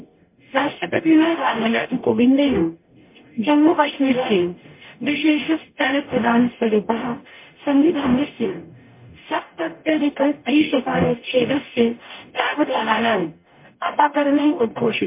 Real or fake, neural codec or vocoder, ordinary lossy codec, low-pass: fake; codec, 44.1 kHz, 0.9 kbps, DAC; MP3, 24 kbps; 3.6 kHz